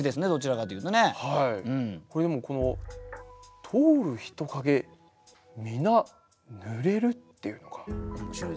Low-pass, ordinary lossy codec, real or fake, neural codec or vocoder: none; none; real; none